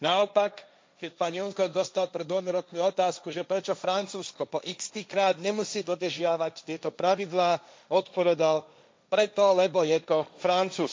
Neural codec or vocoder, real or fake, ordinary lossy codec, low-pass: codec, 16 kHz, 1.1 kbps, Voila-Tokenizer; fake; none; none